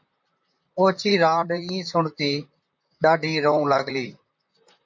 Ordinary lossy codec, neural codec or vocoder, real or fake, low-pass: MP3, 48 kbps; vocoder, 44.1 kHz, 128 mel bands, Pupu-Vocoder; fake; 7.2 kHz